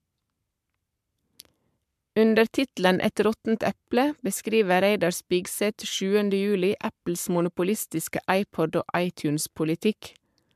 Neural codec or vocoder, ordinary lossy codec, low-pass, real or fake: codec, 44.1 kHz, 7.8 kbps, Pupu-Codec; MP3, 96 kbps; 14.4 kHz; fake